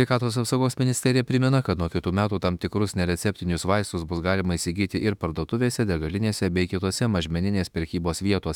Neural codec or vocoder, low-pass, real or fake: autoencoder, 48 kHz, 32 numbers a frame, DAC-VAE, trained on Japanese speech; 19.8 kHz; fake